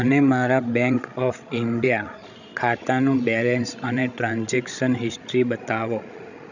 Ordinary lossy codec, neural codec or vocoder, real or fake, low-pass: none; codec, 16 kHz, 16 kbps, FreqCodec, larger model; fake; 7.2 kHz